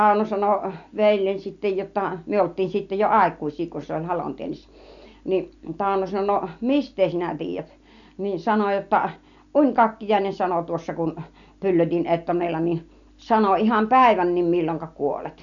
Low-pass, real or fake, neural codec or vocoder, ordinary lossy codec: 7.2 kHz; real; none; none